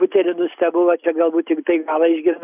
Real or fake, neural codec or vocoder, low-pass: real; none; 3.6 kHz